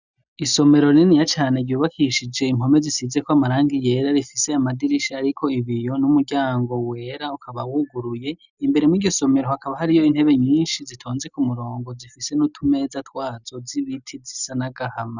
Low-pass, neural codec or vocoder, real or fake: 7.2 kHz; none; real